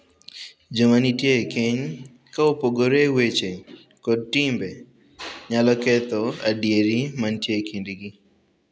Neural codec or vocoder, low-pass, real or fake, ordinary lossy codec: none; none; real; none